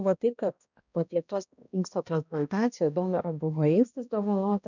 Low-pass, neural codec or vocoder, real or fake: 7.2 kHz; codec, 16 kHz, 1 kbps, X-Codec, HuBERT features, trained on balanced general audio; fake